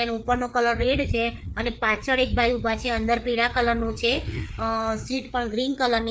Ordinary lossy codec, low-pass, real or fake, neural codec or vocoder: none; none; fake; codec, 16 kHz, 4 kbps, FreqCodec, larger model